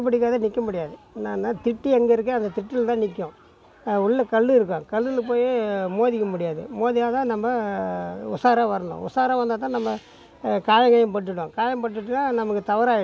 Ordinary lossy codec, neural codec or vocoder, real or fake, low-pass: none; none; real; none